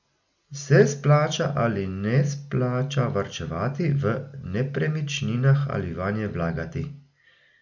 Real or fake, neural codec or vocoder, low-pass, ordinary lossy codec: real; none; 7.2 kHz; none